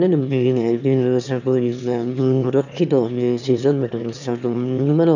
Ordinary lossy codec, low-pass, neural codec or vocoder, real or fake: none; 7.2 kHz; autoencoder, 22.05 kHz, a latent of 192 numbers a frame, VITS, trained on one speaker; fake